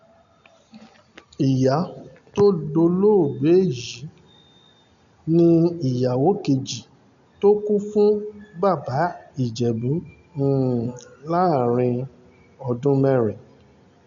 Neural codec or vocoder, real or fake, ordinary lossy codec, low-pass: none; real; none; 7.2 kHz